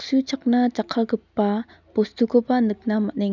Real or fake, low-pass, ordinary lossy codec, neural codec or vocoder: real; 7.2 kHz; none; none